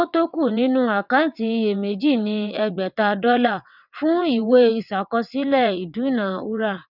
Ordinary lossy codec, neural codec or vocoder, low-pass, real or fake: none; vocoder, 22.05 kHz, 80 mel bands, WaveNeXt; 5.4 kHz; fake